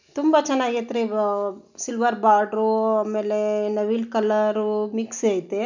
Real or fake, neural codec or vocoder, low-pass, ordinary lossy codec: real; none; 7.2 kHz; none